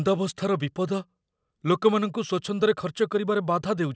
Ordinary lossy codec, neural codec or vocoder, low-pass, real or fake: none; none; none; real